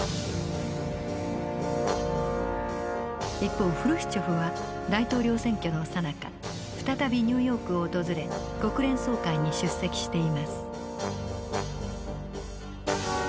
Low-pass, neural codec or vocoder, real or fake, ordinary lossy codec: none; none; real; none